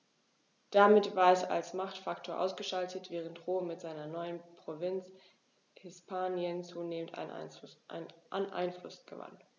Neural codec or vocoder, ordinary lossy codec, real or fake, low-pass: none; none; real; 7.2 kHz